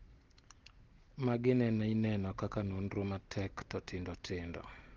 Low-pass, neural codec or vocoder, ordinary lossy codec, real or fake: 7.2 kHz; none; Opus, 16 kbps; real